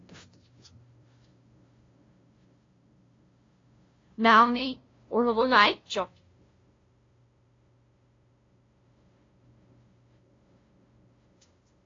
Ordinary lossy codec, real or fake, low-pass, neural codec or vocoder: AAC, 32 kbps; fake; 7.2 kHz; codec, 16 kHz, 0.5 kbps, FunCodec, trained on LibriTTS, 25 frames a second